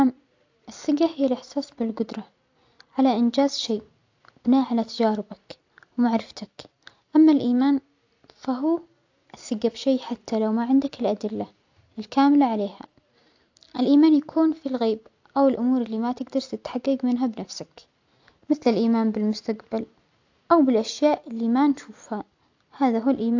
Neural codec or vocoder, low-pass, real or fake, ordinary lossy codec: none; 7.2 kHz; real; AAC, 48 kbps